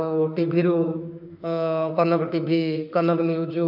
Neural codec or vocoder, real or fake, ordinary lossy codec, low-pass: autoencoder, 48 kHz, 32 numbers a frame, DAC-VAE, trained on Japanese speech; fake; none; 5.4 kHz